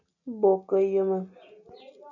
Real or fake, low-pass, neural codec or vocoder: real; 7.2 kHz; none